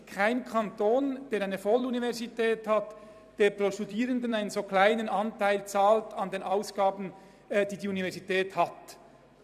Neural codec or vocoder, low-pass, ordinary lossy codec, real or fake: vocoder, 44.1 kHz, 128 mel bands every 256 samples, BigVGAN v2; 14.4 kHz; none; fake